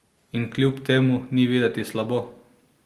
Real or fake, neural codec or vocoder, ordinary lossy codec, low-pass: real; none; Opus, 24 kbps; 14.4 kHz